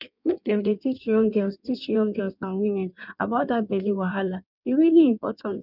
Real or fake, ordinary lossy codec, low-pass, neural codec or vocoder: fake; none; 5.4 kHz; codec, 16 kHz in and 24 kHz out, 1.1 kbps, FireRedTTS-2 codec